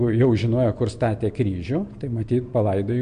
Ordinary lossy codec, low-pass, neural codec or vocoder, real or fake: MP3, 64 kbps; 9.9 kHz; none; real